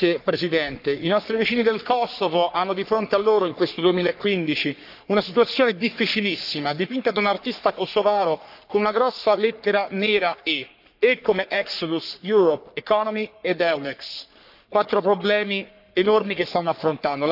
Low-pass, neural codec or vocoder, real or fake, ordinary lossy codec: 5.4 kHz; codec, 44.1 kHz, 3.4 kbps, Pupu-Codec; fake; none